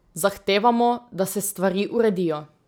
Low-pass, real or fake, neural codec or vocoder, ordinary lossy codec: none; real; none; none